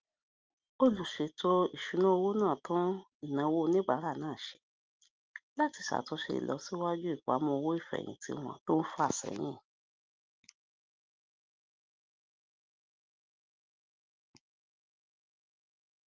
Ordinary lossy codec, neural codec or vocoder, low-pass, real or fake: Opus, 24 kbps; none; 7.2 kHz; real